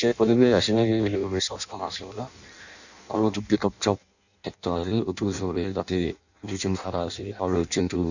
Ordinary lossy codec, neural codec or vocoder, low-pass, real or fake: none; codec, 16 kHz in and 24 kHz out, 0.6 kbps, FireRedTTS-2 codec; 7.2 kHz; fake